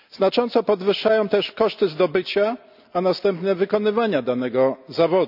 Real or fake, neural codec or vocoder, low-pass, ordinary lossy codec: real; none; 5.4 kHz; none